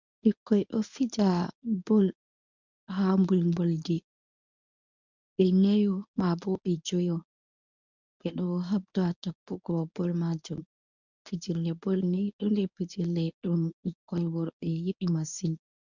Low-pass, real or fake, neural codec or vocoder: 7.2 kHz; fake; codec, 24 kHz, 0.9 kbps, WavTokenizer, medium speech release version 1